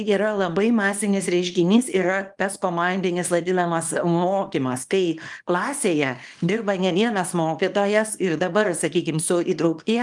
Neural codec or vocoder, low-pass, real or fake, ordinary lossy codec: codec, 24 kHz, 0.9 kbps, WavTokenizer, small release; 10.8 kHz; fake; Opus, 32 kbps